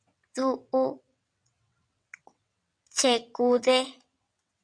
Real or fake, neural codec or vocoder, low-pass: fake; vocoder, 22.05 kHz, 80 mel bands, WaveNeXt; 9.9 kHz